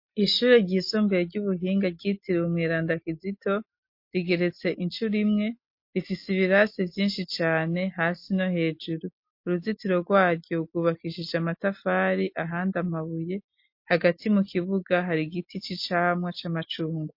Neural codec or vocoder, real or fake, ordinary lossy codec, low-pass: none; real; MP3, 32 kbps; 5.4 kHz